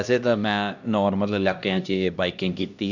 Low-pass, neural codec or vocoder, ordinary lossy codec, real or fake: 7.2 kHz; codec, 16 kHz, 1 kbps, X-Codec, HuBERT features, trained on LibriSpeech; none; fake